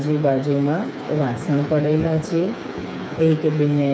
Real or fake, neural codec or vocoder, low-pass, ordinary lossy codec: fake; codec, 16 kHz, 4 kbps, FreqCodec, smaller model; none; none